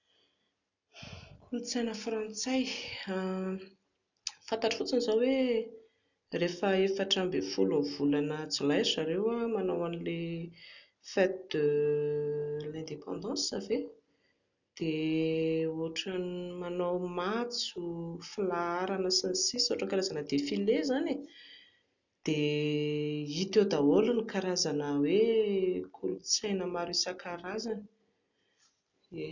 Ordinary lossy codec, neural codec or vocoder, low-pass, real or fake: none; none; 7.2 kHz; real